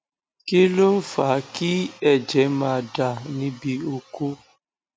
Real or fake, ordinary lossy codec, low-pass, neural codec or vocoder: real; none; none; none